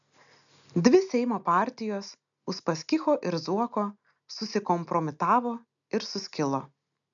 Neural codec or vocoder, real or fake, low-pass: none; real; 7.2 kHz